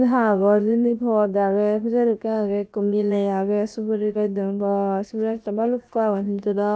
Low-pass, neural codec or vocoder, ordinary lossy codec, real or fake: none; codec, 16 kHz, 0.7 kbps, FocalCodec; none; fake